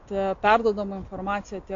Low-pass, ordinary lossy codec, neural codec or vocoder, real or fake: 7.2 kHz; AAC, 48 kbps; none; real